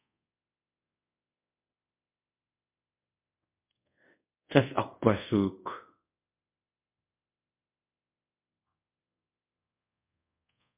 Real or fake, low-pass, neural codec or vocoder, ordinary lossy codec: fake; 3.6 kHz; codec, 24 kHz, 0.5 kbps, DualCodec; MP3, 32 kbps